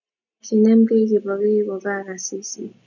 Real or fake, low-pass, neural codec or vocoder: real; 7.2 kHz; none